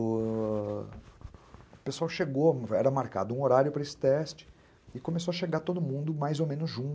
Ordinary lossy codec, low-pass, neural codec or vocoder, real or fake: none; none; none; real